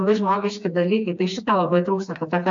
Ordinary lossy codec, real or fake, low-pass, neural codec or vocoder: MP3, 64 kbps; fake; 7.2 kHz; codec, 16 kHz, 2 kbps, FreqCodec, smaller model